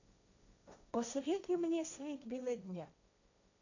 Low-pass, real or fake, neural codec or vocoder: 7.2 kHz; fake; codec, 16 kHz, 1.1 kbps, Voila-Tokenizer